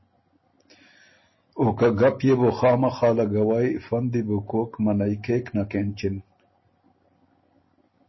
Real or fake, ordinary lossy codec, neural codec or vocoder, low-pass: real; MP3, 24 kbps; none; 7.2 kHz